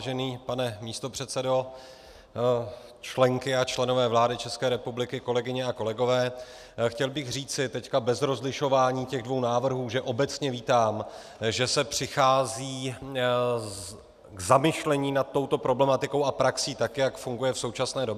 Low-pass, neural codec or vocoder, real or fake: 14.4 kHz; none; real